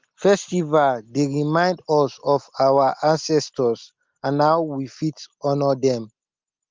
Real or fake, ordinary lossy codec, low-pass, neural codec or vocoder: real; Opus, 32 kbps; 7.2 kHz; none